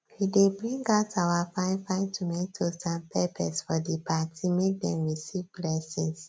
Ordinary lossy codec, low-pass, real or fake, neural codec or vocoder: none; none; real; none